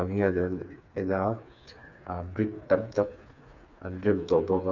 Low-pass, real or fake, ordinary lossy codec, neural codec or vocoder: 7.2 kHz; fake; none; codec, 16 kHz, 4 kbps, FreqCodec, smaller model